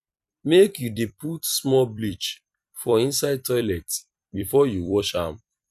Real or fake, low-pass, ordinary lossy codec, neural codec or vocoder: fake; 14.4 kHz; none; vocoder, 44.1 kHz, 128 mel bands every 256 samples, BigVGAN v2